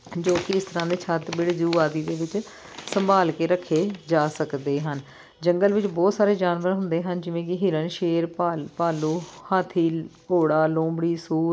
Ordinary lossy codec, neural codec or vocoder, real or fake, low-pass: none; none; real; none